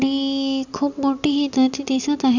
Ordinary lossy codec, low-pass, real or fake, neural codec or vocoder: none; 7.2 kHz; real; none